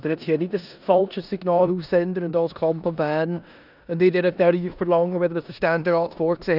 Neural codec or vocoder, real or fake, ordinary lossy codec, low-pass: codec, 16 kHz in and 24 kHz out, 0.9 kbps, LongCat-Audio-Codec, four codebook decoder; fake; none; 5.4 kHz